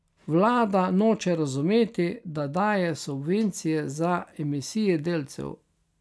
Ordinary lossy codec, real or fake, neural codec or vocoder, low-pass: none; real; none; none